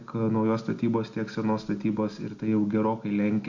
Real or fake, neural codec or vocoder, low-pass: real; none; 7.2 kHz